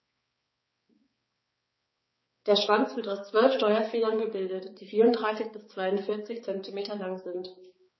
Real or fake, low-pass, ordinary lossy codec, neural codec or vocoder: fake; 7.2 kHz; MP3, 24 kbps; codec, 16 kHz, 4 kbps, X-Codec, HuBERT features, trained on balanced general audio